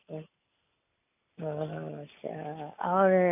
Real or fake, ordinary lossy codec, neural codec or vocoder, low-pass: real; none; none; 3.6 kHz